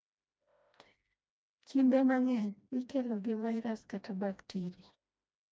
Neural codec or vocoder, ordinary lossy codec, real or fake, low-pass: codec, 16 kHz, 1 kbps, FreqCodec, smaller model; none; fake; none